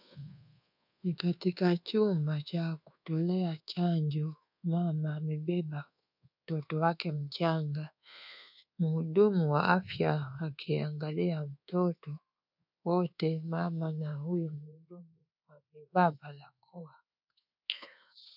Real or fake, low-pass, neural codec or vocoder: fake; 5.4 kHz; codec, 24 kHz, 1.2 kbps, DualCodec